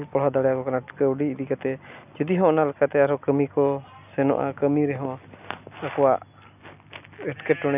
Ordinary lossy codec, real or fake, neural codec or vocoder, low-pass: none; real; none; 3.6 kHz